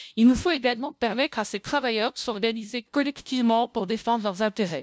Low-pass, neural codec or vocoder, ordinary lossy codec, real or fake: none; codec, 16 kHz, 0.5 kbps, FunCodec, trained on LibriTTS, 25 frames a second; none; fake